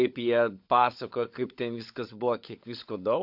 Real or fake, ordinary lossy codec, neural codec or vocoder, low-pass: fake; AAC, 48 kbps; codec, 16 kHz, 16 kbps, FunCodec, trained on LibriTTS, 50 frames a second; 5.4 kHz